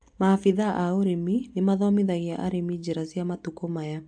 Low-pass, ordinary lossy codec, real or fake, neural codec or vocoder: 9.9 kHz; AAC, 64 kbps; real; none